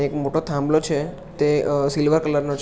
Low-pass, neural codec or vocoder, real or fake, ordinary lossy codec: none; none; real; none